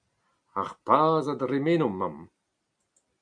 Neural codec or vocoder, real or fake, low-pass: none; real; 9.9 kHz